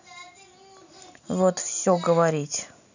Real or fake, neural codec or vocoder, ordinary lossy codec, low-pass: real; none; none; 7.2 kHz